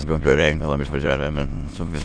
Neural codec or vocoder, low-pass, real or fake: autoencoder, 22.05 kHz, a latent of 192 numbers a frame, VITS, trained on many speakers; 9.9 kHz; fake